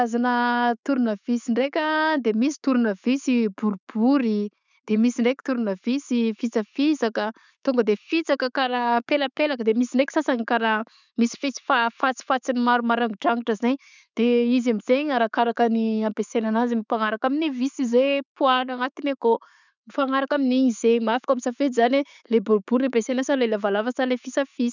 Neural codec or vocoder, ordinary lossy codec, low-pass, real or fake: none; none; 7.2 kHz; real